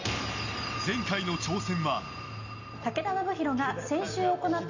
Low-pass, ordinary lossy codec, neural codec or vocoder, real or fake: 7.2 kHz; none; none; real